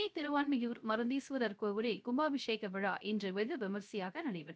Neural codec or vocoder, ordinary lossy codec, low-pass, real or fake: codec, 16 kHz, 0.3 kbps, FocalCodec; none; none; fake